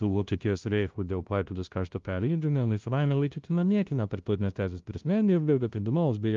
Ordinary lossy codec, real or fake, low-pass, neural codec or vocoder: Opus, 32 kbps; fake; 7.2 kHz; codec, 16 kHz, 0.5 kbps, FunCodec, trained on LibriTTS, 25 frames a second